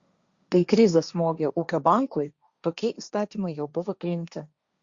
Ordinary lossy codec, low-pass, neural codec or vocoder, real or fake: Opus, 64 kbps; 7.2 kHz; codec, 16 kHz, 1.1 kbps, Voila-Tokenizer; fake